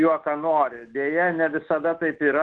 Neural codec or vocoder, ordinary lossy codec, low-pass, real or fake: autoencoder, 48 kHz, 128 numbers a frame, DAC-VAE, trained on Japanese speech; Opus, 16 kbps; 9.9 kHz; fake